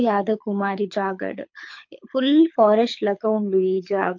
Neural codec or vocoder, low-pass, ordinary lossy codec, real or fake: codec, 24 kHz, 6 kbps, HILCodec; 7.2 kHz; MP3, 48 kbps; fake